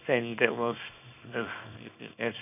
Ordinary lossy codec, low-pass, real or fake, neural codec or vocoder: none; 3.6 kHz; fake; codec, 24 kHz, 0.9 kbps, WavTokenizer, small release